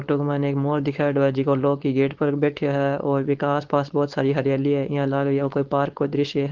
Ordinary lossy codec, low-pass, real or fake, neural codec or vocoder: Opus, 16 kbps; 7.2 kHz; fake; codec, 16 kHz, 4.8 kbps, FACodec